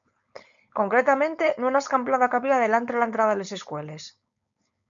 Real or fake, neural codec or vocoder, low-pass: fake; codec, 16 kHz, 4.8 kbps, FACodec; 7.2 kHz